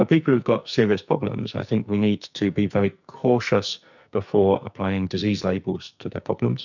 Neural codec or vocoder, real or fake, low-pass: codec, 44.1 kHz, 2.6 kbps, SNAC; fake; 7.2 kHz